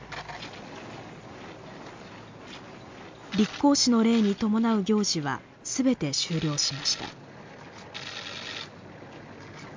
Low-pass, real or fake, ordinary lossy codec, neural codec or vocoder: 7.2 kHz; real; MP3, 64 kbps; none